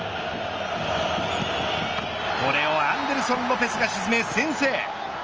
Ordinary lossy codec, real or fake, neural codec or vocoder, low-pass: Opus, 24 kbps; real; none; 7.2 kHz